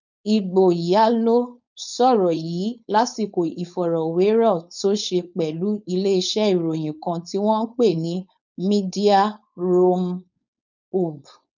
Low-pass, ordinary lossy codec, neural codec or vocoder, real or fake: 7.2 kHz; none; codec, 16 kHz, 4.8 kbps, FACodec; fake